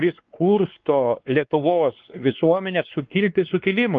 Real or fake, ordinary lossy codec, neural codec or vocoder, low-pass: fake; Opus, 24 kbps; codec, 16 kHz, 2 kbps, X-Codec, WavLM features, trained on Multilingual LibriSpeech; 7.2 kHz